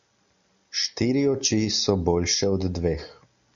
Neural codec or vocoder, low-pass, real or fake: none; 7.2 kHz; real